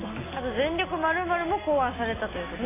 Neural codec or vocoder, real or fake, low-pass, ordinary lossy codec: none; real; 3.6 kHz; AAC, 16 kbps